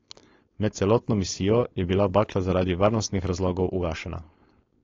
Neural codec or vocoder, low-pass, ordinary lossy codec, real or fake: codec, 16 kHz, 4.8 kbps, FACodec; 7.2 kHz; AAC, 32 kbps; fake